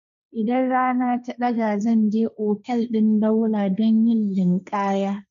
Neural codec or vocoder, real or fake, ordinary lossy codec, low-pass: codec, 16 kHz, 1.1 kbps, Voila-Tokenizer; fake; none; 7.2 kHz